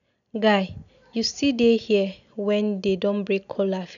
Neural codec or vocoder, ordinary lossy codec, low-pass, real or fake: none; none; 7.2 kHz; real